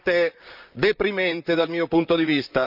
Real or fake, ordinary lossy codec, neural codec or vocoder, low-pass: fake; none; vocoder, 44.1 kHz, 128 mel bands, Pupu-Vocoder; 5.4 kHz